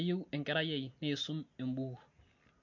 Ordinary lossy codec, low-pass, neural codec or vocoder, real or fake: MP3, 48 kbps; 7.2 kHz; none; real